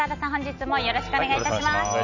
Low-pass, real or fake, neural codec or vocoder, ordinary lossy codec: 7.2 kHz; real; none; none